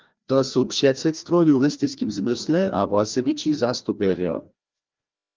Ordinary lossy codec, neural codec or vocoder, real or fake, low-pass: Opus, 32 kbps; codec, 16 kHz, 1 kbps, FreqCodec, larger model; fake; 7.2 kHz